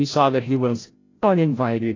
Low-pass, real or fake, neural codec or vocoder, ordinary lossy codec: 7.2 kHz; fake; codec, 16 kHz, 0.5 kbps, FreqCodec, larger model; AAC, 32 kbps